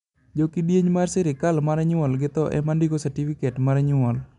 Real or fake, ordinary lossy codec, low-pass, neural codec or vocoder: real; MP3, 96 kbps; 10.8 kHz; none